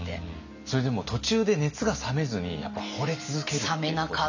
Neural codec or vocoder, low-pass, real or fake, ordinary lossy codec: none; 7.2 kHz; real; none